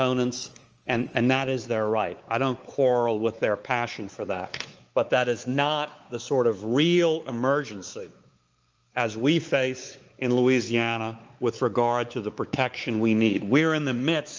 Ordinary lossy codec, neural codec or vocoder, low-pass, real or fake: Opus, 24 kbps; codec, 16 kHz, 4 kbps, X-Codec, WavLM features, trained on Multilingual LibriSpeech; 7.2 kHz; fake